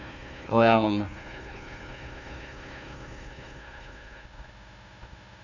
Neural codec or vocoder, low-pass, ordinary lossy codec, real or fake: codec, 16 kHz, 1 kbps, FunCodec, trained on Chinese and English, 50 frames a second; 7.2 kHz; none; fake